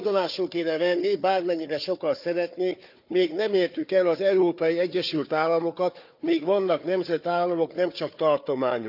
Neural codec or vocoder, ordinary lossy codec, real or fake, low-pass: codec, 16 kHz, 4 kbps, FunCodec, trained on Chinese and English, 50 frames a second; none; fake; 5.4 kHz